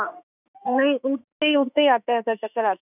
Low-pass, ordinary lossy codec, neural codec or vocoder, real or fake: 3.6 kHz; none; autoencoder, 48 kHz, 32 numbers a frame, DAC-VAE, trained on Japanese speech; fake